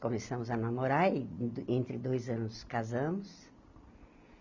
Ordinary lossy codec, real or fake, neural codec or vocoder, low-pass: none; real; none; 7.2 kHz